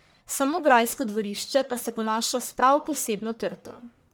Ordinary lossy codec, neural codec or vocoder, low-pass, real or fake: none; codec, 44.1 kHz, 1.7 kbps, Pupu-Codec; none; fake